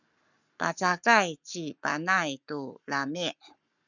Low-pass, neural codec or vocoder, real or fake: 7.2 kHz; codec, 44.1 kHz, 7.8 kbps, Pupu-Codec; fake